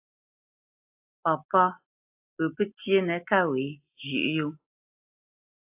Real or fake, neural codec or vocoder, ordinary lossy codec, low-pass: real; none; AAC, 32 kbps; 3.6 kHz